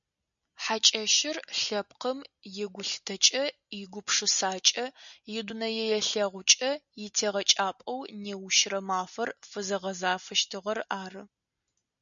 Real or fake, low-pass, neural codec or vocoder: real; 7.2 kHz; none